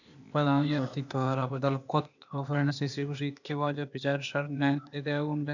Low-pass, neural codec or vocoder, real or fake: 7.2 kHz; codec, 16 kHz, 0.8 kbps, ZipCodec; fake